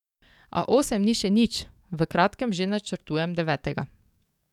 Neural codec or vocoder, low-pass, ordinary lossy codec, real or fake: codec, 44.1 kHz, 7.8 kbps, DAC; 19.8 kHz; none; fake